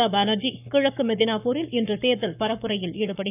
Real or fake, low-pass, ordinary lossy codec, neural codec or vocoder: fake; 3.6 kHz; none; codec, 44.1 kHz, 7.8 kbps, Pupu-Codec